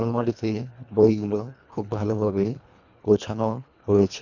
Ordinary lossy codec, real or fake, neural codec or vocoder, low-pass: none; fake; codec, 24 kHz, 1.5 kbps, HILCodec; 7.2 kHz